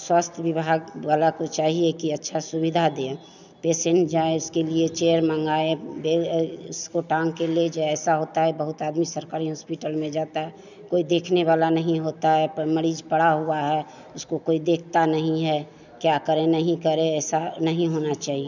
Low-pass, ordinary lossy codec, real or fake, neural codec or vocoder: 7.2 kHz; none; real; none